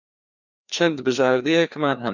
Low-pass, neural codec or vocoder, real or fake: 7.2 kHz; codec, 16 kHz, 2 kbps, FreqCodec, larger model; fake